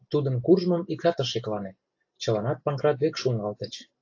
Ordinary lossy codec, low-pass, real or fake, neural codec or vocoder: AAC, 48 kbps; 7.2 kHz; real; none